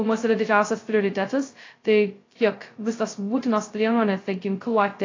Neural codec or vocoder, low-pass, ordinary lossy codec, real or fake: codec, 16 kHz, 0.2 kbps, FocalCodec; 7.2 kHz; AAC, 32 kbps; fake